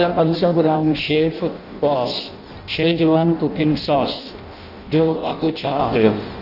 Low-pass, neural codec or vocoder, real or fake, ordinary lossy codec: 5.4 kHz; codec, 16 kHz in and 24 kHz out, 0.6 kbps, FireRedTTS-2 codec; fake; none